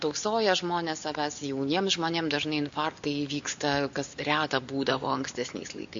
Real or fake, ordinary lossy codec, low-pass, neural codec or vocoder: real; MP3, 64 kbps; 7.2 kHz; none